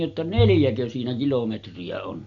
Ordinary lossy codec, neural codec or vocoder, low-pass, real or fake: none; none; 7.2 kHz; real